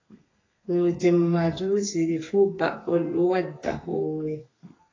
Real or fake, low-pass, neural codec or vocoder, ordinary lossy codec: fake; 7.2 kHz; codec, 32 kHz, 1.9 kbps, SNAC; AAC, 32 kbps